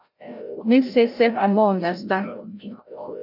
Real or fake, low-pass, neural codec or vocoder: fake; 5.4 kHz; codec, 16 kHz, 0.5 kbps, FreqCodec, larger model